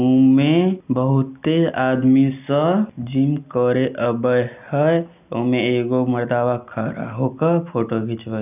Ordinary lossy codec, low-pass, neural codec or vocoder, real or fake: none; 3.6 kHz; none; real